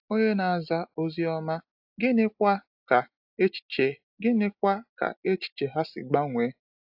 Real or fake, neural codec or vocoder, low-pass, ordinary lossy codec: real; none; 5.4 kHz; AAC, 48 kbps